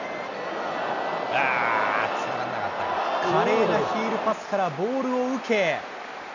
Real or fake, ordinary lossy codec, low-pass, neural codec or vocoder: real; none; 7.2 kHz; none